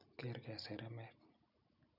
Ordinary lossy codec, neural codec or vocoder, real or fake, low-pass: none; none; real; 5.4 kHz